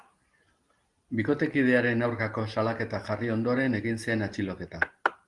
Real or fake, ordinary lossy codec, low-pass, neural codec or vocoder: real; Opus, 24 kbps; 10.8 kHz; none